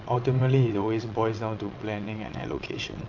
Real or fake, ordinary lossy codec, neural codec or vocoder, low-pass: fake; AAC, 48 kbps; vocoder, 22.05 kHz, 80 mel bands, WaveNeXt; 7.2 kHz